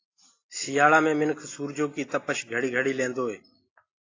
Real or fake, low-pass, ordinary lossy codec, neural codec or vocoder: real; 7.2 kHz; AAC, 32 kbps; none